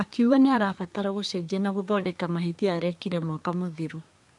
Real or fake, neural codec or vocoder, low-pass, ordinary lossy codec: fake; codec, 24 kHz, 1 kbps, SNAC; 10.8 kHz; none